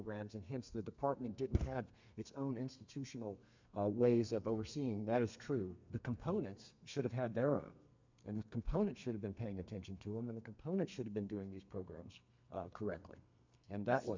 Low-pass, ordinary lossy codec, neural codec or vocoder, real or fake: 7.2 kHz; MP3, 64 kbps; codec, 32 kHz, 1.9 kbps, SNAC; fake